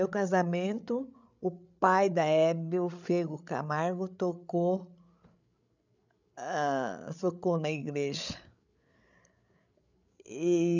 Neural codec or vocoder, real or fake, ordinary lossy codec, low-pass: codec, 16 kHz, 8 kbps, FreqCodec, larger model; fake; none; 7.2 kHz